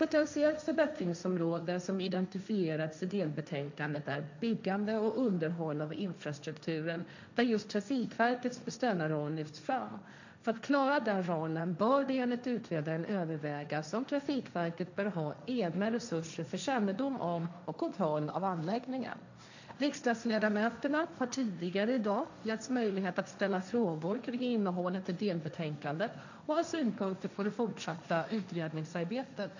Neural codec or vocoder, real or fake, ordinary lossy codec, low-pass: codec, 16 kHz, 1.1 kbps, Voila-Tokenizer; fake; none; 7.2 kHz